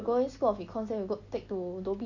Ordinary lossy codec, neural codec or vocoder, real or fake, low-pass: none; none; real; 7.2 kHz